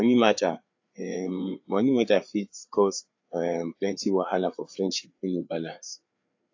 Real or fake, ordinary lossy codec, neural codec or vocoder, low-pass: fake; AAC, 48 kbps; codec, 16 kHz, 4 kbps, FreqCodec, larger model; 7.2 kHz